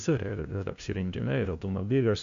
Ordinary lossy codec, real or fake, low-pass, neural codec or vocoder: AAC, 64 kbps; fake; 7.2 kHz; codec, 16 kHz, 0.5 kbps, FunCodec, trained on LibriTTS, 25 frames a second